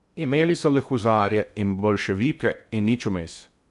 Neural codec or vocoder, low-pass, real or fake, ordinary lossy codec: codec, 16 kHz in and 24 kHz out, 0.6 kbps, FocalCodec, streaming, 2048 codes; 10.8 kHz; fake; AAC, 96 kbps